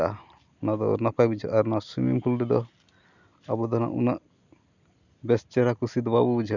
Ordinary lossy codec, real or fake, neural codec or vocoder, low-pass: none; real; none; 7.2 kHz